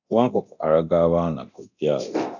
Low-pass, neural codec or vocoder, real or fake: 7.2 kHz; codec, 24 kHz, 0.9 kbps, DualCodec; fake